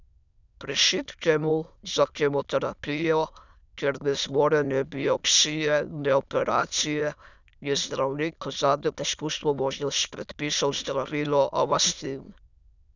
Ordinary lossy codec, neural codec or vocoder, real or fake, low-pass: none; autoencoder, 22.05 kHz, a latent of 192 numbers a frame, VITS, trained on many speakers; fake; 7.2 kHz